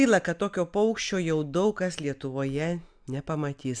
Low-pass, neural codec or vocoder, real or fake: 9.9 kHz; none; real